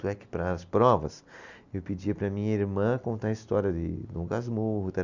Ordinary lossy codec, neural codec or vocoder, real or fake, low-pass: none; none; real; 7.2 kHz